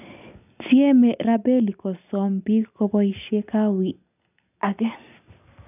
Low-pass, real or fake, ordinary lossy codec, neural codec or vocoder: 3.6 kHz; real; none; none